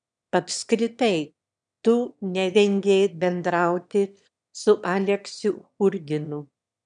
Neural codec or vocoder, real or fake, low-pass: autoencoder, 22.05 kHz, a latent of 192 numbers a frame, VITS, trained on one speaker; fake; 9.9 kHz